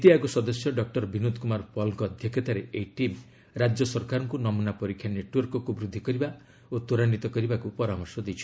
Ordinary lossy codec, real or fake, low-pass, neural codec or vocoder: none; real; none; none